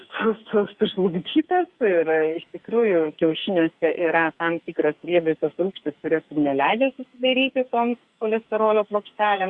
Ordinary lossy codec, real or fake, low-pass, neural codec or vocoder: Opus, 64 kbps; fake; 10.8 kHz; codec, 44.1 kHz, 2.6 kbps, SNAC